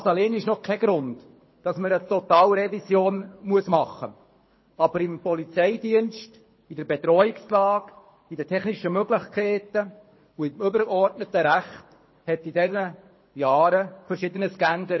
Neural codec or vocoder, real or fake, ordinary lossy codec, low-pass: codec, 24 kHz, 6 kbps, HILCodec; fake; MP3, 24 kbps; 7.2 kHz